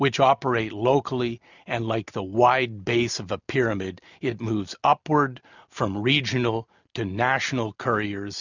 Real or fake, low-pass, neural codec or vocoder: real; 7.2 kHz; none